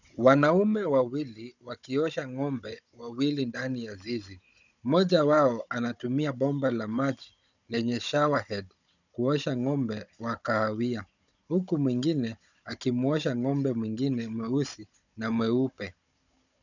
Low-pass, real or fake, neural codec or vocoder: 7.2 kHz; fake; codec, 16 kHz, 16 kbps, FunCodec, trained on Chinese and English, 50 frames a second